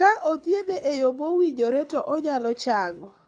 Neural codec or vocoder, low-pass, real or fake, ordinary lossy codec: codec, 24 kHz, 6 kbps, HILCodec; 9.9 kHz; fake; AAC, 64 kbps